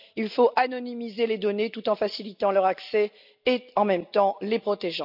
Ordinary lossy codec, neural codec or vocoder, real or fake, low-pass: none; none; real; 5.4 kHz